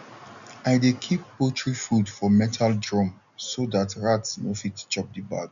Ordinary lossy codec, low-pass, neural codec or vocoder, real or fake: MP3, 96 kbps; 7.2 kHz; none; real